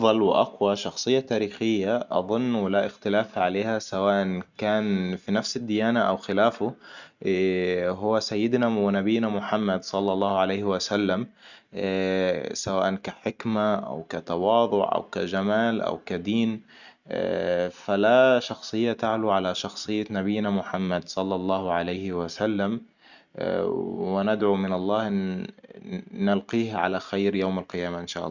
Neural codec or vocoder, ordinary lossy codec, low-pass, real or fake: none; none; 7.2 kHz; real